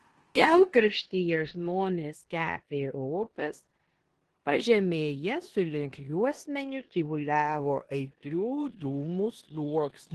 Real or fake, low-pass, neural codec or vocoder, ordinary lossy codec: fake; 10.8 kHz; codec, 16 kHz in and 24 kHz out, 0.9 kbps, LongCat-Audio-Codec, four codebook decoder; Opus, 16 kbps